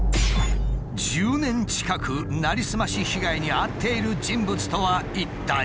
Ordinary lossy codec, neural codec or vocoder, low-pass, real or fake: none; none; none; real